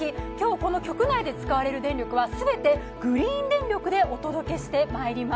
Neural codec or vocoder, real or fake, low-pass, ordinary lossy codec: none; real; none; none